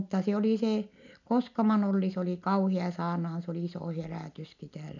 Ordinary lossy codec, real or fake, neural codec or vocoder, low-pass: none; real; none; 7.2 kHz